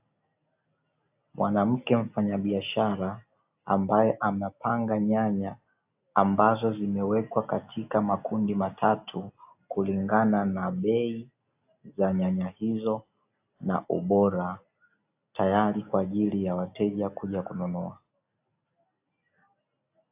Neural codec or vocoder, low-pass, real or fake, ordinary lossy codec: none; 3.6 kHz; real; AAC, 32 kbps